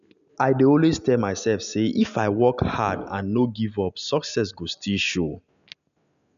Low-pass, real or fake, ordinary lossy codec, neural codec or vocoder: 7.2 kHz; real; none; none